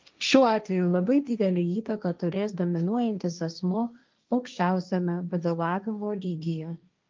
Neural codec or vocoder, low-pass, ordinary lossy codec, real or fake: codec, 16 kHz, 1.1 kbps, Voila-Tokenizer; 7.2 kHz; Opus, 24 kbps; fake